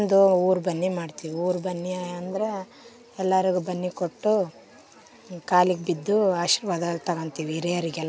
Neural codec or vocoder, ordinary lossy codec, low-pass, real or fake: none; none; none; real